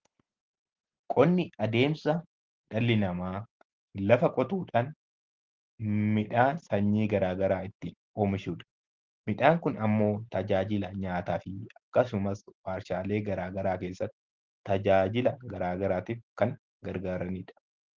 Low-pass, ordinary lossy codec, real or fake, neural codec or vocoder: 7.2 kHz; Opus, 16 kbps; real; none